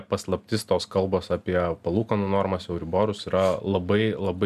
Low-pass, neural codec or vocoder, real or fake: 14.4 kHz; none; real